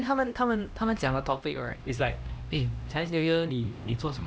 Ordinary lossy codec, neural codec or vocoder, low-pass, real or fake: none; codec, 16 kHz, 1 kbps, X-Codec, HuBERT features, trained on LibriSpeech; none; fake